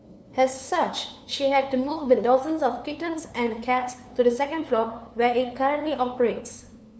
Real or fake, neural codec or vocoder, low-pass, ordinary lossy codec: fake; codec, 16 kHz, 2 kbps, FunCodec, trained on LibriTTS, 25 frames a second; none; none